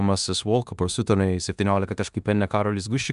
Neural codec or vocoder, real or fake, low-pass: codec, 16 kHz in and 24 kHz out, 0.9 kbps, LongCat-Audio-Codec, four codebook decoder; fake; 10.8 kHz